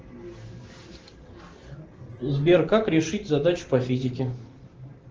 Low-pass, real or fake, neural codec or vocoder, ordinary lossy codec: 7.2 kHz; real; none; Opus, 16 kbps